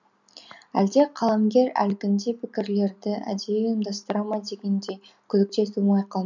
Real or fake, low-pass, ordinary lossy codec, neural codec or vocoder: real; 7.2 kHz; none; none